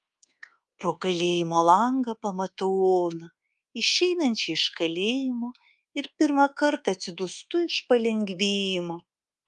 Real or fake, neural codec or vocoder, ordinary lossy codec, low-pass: fake; codec, 24 kHz, 1.2 kbps, DualCodec; Opus, 32 kbps; 10.8 kHz